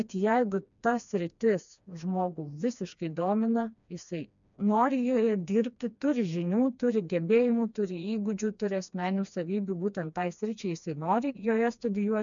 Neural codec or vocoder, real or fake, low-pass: codec, 16 kHz, 2 kbps, FreqCodec, smaller model; fake; 7.2 kHz